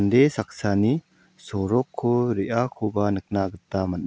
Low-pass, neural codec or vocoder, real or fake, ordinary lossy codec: none; none; real; none